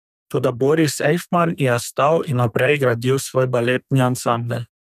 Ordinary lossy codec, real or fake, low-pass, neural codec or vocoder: none; fake; 14.4 kHz; codec, 32 kHz, 1.9 kbps, SNAC